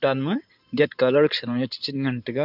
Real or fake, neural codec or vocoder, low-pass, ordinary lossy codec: fake; codec, 16 kHz in and 24 kHz out, 2.2 kbps, FireRedTTS-2 codec; 5.4 kHz; none